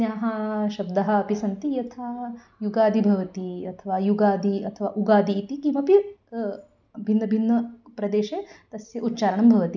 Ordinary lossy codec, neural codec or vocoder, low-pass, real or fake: none; none; 7.2 kHz; real